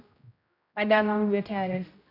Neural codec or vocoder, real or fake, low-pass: codec, 16 kHz, 0.5 kbps, X-Codec, HuBERT features, trained on general audio; fake; 5.4 kHz